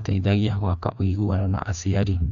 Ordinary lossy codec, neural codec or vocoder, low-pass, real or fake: none; codec, 16 kHz, 2 kbps, FreqCodec, larger model; 7.2 kHz; fake